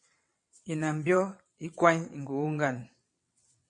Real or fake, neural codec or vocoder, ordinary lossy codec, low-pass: fake; vocoder, 22.05 kHz, 80 mel bands, WaveNeXt; MP3, 48 kbps; 9.9 kHz